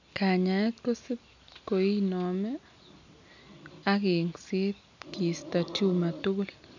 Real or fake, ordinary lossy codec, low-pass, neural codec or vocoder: real; none; 7.2 kHz; none